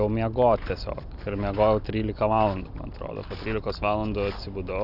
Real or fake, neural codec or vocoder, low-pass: real; none; 5.4 kHz